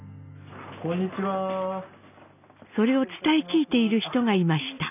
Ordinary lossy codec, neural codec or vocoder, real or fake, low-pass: none; none; real; 3.6 kHz